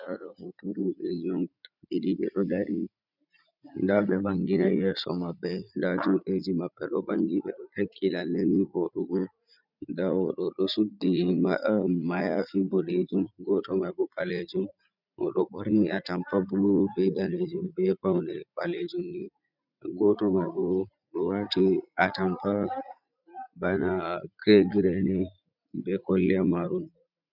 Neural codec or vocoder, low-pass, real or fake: vocoder, 44.1 kHz, 80 mel bands, Vocos; 5.4 kHz; fake